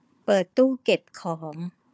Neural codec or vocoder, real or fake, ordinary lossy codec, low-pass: codec, 16 kHz, 4 kbps, FunCodec, trained on Chinese and English, 50 frames a second; fake; none; none